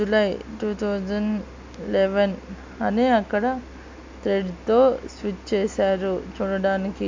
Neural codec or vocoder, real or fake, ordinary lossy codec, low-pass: none; real; MP3, 64 kbps; 7.2 kHz